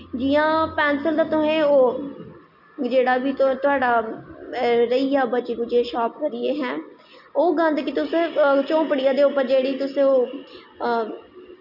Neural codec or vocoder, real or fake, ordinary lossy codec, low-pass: none; real; none; 5.4 kHz